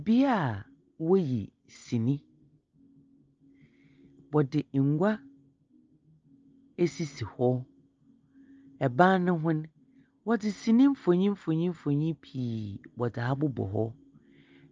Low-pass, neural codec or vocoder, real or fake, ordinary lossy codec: 7.2 kHz; none; real; Opus, 24 kbps